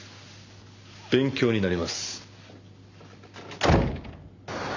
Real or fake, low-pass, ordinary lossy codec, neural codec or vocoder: real; 7.2 kHz; none; none